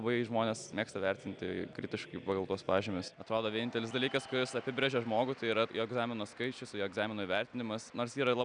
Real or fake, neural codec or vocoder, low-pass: real; none; 9.9 kHz